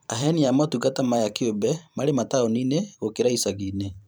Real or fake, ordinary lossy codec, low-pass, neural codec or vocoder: fake; none; none; vocoder, 44.1 kHz, 128 mel bands every 512 samples, BigVGAN v2